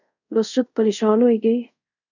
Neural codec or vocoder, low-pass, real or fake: codec, 24 kHz, 0.5 kbps, DualCodec; 7.2 kHz; fake